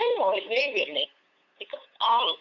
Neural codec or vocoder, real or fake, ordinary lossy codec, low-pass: codec, 16 kHz, 8 kbps, FunCodec, trained on LibriTTS, 25 frames a second; fake; none; 7.2 kHz